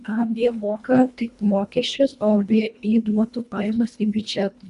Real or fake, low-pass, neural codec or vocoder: fake; 10.8 kHz; codec, 24 kHz, 1.5 kbps, HILCodec